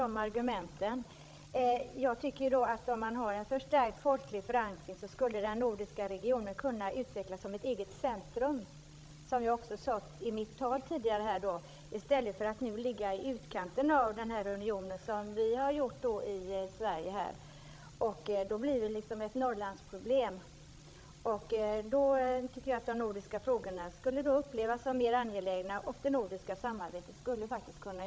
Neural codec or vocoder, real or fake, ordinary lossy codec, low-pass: codec, 16 kHz, 16 kbps, FreqCodec, larger model; fake; none; none